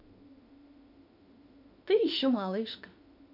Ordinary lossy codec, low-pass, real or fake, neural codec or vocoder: none; 5.4 kHz; fake; autoencoder, 48 kHz, 32 numbers a frame, DAC-VAE, trained on Japanese speech